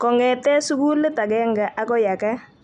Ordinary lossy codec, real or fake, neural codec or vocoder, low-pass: none; real; none; 10.8 kHz